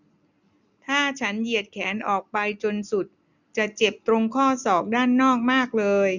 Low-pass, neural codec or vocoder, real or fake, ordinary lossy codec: 7.2 kHz; none; real; none